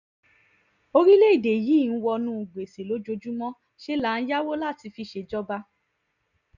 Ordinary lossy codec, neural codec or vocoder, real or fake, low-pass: none; none; real; 7.2 kHz